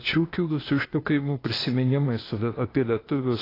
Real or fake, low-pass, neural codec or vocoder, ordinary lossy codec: fake; 5.4 kHz; codec, 16 kHz, 0.8 kbps, ZipCodec; AAC, 24 kbps